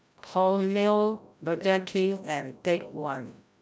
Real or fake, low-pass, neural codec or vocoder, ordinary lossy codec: fake; none; codec, 16 kHz, 0.5 kbps, FreqCodec, larger model; none